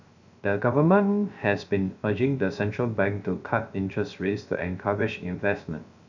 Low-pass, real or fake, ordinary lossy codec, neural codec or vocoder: 7.2 kHz; fake; none; codec, 16 kHz, 0.3 kbps, FocalCodec